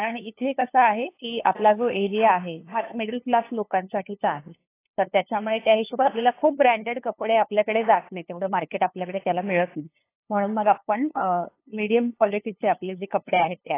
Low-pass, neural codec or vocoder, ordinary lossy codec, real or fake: 3.6 kHz; codec, 16 kHz, 2 kbps, FunCodec, trained on LibriTTS, 25 frames a second; AAC, 24 kbps; fake